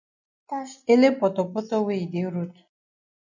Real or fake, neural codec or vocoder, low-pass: real; none; 7.2 kHz